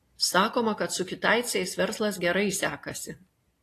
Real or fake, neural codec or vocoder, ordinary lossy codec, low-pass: real; none; AAC, 48 kbps; 14.4 kHz